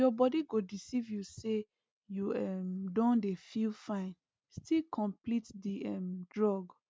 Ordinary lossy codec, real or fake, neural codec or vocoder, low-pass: none; real; none; none